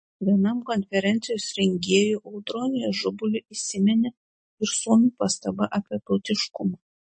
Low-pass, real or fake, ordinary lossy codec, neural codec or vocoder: 9.9 kHz; real; MP3, 32 kbps; none